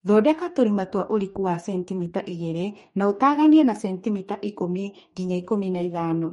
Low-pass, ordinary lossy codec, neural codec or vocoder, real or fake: 19.8 kHz; MP3, 48 kbps; codec, 44.1 kHz, 2.6 kbps, DAC; fake